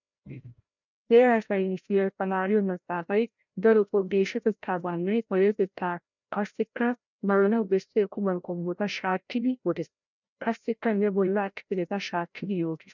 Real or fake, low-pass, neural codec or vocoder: fake; 7.2 kHz; codec, 16 kHz, 0.5 kbps, FreqCodec, larger model